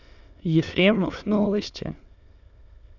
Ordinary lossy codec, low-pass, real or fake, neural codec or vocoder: none; 7.2 kHz; fake; autoencoder, 22.05 kHz, a latent of 192 numbers a frame, VITS, trained on many speakers